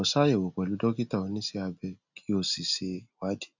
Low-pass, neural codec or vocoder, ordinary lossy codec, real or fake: 7.2 kHz; none; none; real